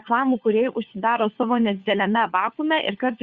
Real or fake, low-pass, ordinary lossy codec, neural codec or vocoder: fake; 7.2 kHz; Opus, 64 kbps; codec, 16 kHz, 4 kbps, FunCodec, trained on LibriTTS, 50 frames a second